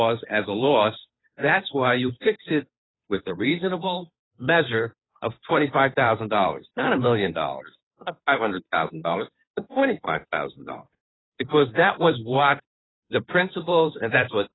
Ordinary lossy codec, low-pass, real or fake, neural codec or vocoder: AAC, 16 kbps; 7.2 kHz; fake; codec, 16 kHz, 2 kbps, FunCodec, trained on Chinese and English, 25 frames a second